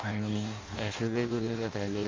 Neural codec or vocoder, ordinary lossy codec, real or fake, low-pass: codec, 16 kHz in and 24 kHz out, 0.6 kbps, FireRedTTS-2 codec; Opus, 32 kbps; fake; 7.2 kHz